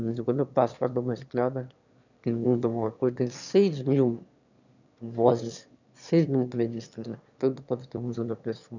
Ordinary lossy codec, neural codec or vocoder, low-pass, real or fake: none; autoencoder, 22.05 kHz, a latent of 192 numbers a frame, VITS, trained on one speaker; 7.2 kHz; fake